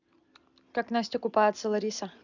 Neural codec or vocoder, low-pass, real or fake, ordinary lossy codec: none; 7.2 kHz; real; none